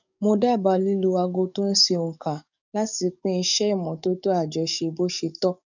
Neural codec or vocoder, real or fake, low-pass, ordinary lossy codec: codec, 44.1 kHz, 7.8 kbps, DAC; fake; 7.2 kHz; none